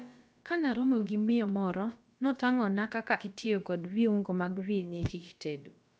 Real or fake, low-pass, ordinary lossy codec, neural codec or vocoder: fake; none; none; codec, 16 kHz, about 1 kbps, DyCAST, with the encoder's durations